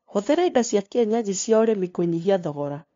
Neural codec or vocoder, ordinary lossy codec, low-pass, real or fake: codec, 16 kHz, 2 kbps, FunCodec, trained on LibriTTS, 25 frames a second; MP3, 48 kbps; 7.2 kHz; fake